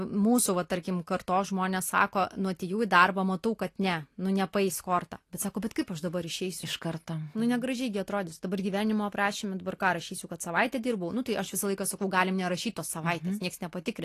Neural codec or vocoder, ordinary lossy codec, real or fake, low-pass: none; AAC, 48 kbps; real; 14.4 kHz